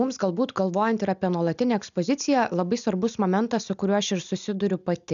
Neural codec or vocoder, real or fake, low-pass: none; real; 7.2 kHz